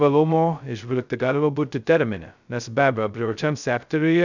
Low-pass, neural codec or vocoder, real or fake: 7.2 kHz; codec, 16 kHz, 0.2 kbps, FocalCodec; fake